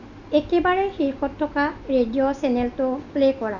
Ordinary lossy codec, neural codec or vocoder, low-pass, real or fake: none; none; 7.2 kHz; real